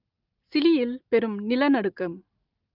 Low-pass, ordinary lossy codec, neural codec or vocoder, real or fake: 5.4 kHz; Opus, 24 kbps; none; real